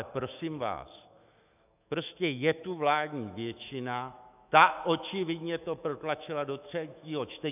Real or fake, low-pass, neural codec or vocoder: fake; 3.6 kHz; autoencoder, 48 kHz, 128 numbers a frame, DAC-VAE, trained on Japanese speech